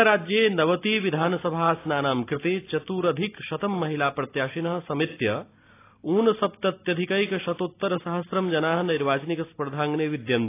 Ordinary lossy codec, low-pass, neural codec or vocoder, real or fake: AAC, 24 kbps; 3.6 kHz; none; real